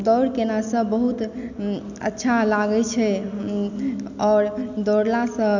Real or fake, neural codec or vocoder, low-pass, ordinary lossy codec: real; none; 7.2 kHz; none